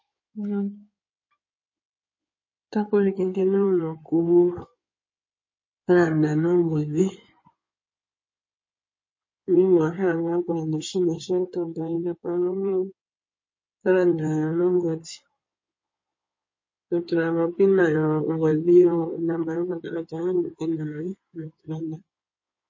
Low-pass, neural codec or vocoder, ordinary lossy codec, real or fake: 7.2 kHz; codec, 16 kHz in and 24 kHz out, 2.2 kbps, FireRedTTS-2 codec; MP3, 32 kbps; fake